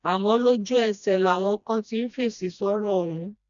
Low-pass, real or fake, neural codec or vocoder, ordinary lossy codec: 7.2 kHz; fake; codec, 16 kHz, 1 kbps, FreqCodec, smaller model; none